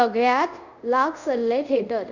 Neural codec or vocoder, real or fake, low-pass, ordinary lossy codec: codec, 24 kHz, 0.5 kbps, DualCodec; fake; 7.2 kHz; none